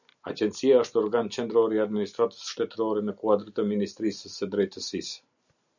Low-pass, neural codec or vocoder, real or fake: 7.2 kHz; none; real